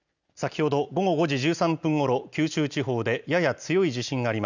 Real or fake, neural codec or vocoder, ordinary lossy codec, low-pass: real; none; none; 7.2 kHz